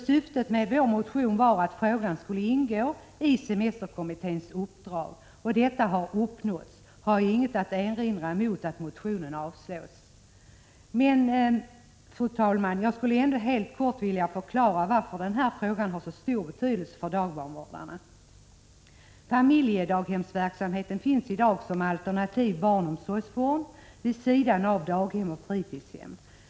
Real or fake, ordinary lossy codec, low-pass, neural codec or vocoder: real; none; none; none